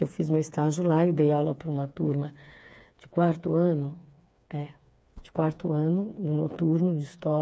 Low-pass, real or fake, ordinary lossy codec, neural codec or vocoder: none; fake; none; codec, 16 kHz, 4 kbps, FreqCodec, smaller model